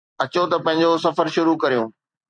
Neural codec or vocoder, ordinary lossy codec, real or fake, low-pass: vocoder, 44.1 kHz, 128 mel bands every 256 samples, BigVGAN v2; MP3, 96 kbps; fake; 9.9 kHz